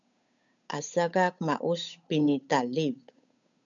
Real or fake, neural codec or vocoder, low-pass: fake; codec, 16 kHz, 8 kbps, FunCodec, trained on Chinese and English, 25 frames a second; 7.2 kHz